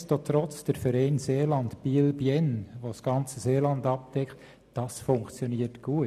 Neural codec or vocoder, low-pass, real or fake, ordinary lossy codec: none; 14.4 kHz; real; none